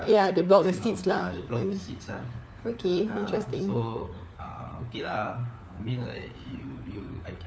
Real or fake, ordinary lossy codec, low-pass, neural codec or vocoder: fake; none; none; codec, 16 kHz, 4 kbps, FunCodec, trained on LibriTTS, 50 frames a second